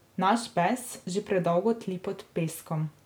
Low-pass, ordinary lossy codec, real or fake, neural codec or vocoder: none; none; real; none